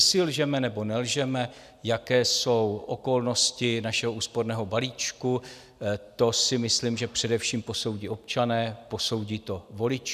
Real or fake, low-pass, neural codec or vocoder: real; 14.4 kHz; none